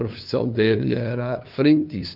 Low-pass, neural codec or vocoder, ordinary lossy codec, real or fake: 5.4 kHz; codec, 16 kHz, 2 kbps, FunCodec, trained on LibriTTS, 25 frames a second; none; fake